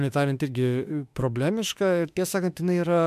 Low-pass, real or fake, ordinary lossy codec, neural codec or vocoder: 14.4 kHz; fake; MP3, 96 kbps; autoencoder, 48 kHz, 32 numbers a frame, DAC-VAE, trained on Japanese speech